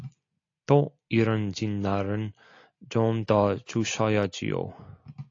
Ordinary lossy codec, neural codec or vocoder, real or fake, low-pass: AAC, 48 kbps; none; real; 7.2 kHz